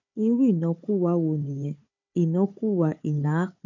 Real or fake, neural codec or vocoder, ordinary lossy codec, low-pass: fake; codec, 16 kHz, 4 kbps, FunCodec, trained on Chinese and English, 50 frames a second; none; 7.2 kHz